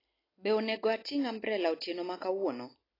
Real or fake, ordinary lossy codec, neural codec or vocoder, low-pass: real; AAC, 24 kbps; none; 5.4 kHz